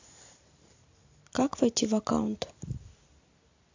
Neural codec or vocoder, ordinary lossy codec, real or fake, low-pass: none; AAC, 48 kbps; real; 7.2 kHz